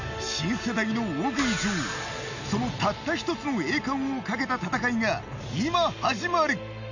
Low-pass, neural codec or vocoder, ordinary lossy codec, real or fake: 7.2 kHz; none; none; real